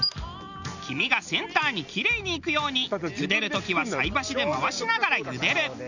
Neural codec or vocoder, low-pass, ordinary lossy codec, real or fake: none; 7.2 kHz; none; real